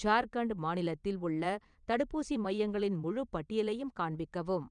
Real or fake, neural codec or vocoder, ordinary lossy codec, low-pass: fake; vocoder, 22.05 kHz, 80 mel bands, Vocos; none; 9.9 kHz